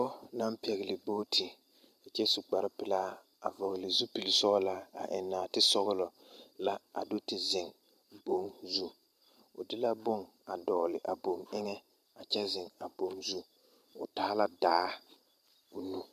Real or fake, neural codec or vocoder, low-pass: fake; vocoder, 44.1 kHz, 128 mel bands every 512 samples, BigVGAN v2; 14.4 kHz